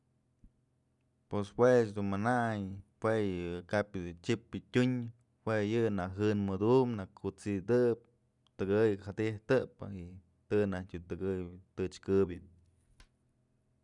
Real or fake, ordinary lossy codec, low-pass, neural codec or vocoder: real; none; 10.8 kHz; none